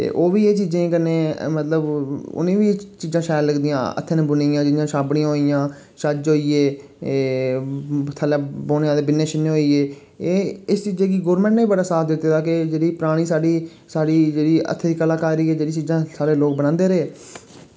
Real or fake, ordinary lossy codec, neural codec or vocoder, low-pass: real; none; none; none